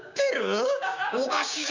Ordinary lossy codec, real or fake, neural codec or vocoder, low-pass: MP3, 64 kbps; fake; codec, 16 kHz, 6 kbps, DAC; 7.2 kHz